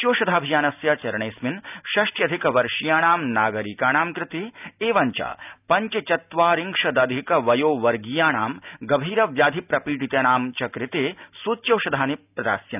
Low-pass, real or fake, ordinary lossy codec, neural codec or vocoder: 3.6 kHz; real; none; none